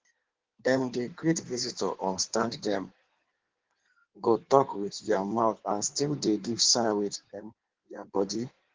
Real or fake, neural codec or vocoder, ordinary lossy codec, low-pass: fake; codec, 16 kHz in and 24 kHz out, 1.1 kbps, FireRedTTS-2 codec; Opus, 16 kbps; 7.2 kHz